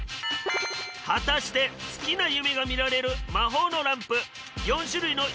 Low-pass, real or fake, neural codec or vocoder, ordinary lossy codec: none; real; none; none